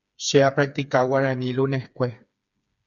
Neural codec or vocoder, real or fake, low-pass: codec, 16 kHz, 4 kbps, FreqCodec, smaller model; fake; 7.2 kHz